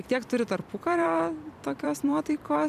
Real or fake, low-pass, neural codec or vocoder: fake; 14.4 kHz; vocoder, 44.1 kHz, 128 mel bands every 256 samples, BigVGAN v2